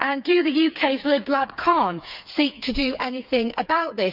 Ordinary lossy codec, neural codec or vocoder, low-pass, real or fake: none; codec, 16 kHz, 4 kbps, FreqCodec, smaller model; 5.4 kHz; fake